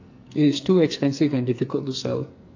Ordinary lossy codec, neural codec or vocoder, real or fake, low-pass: AAC, 48 kbps; codec, 44.1 kHz, 2.6 kbps, SNAC; fake; 7.2 kHz